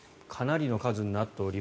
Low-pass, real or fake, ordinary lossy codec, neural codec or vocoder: none; real; none; none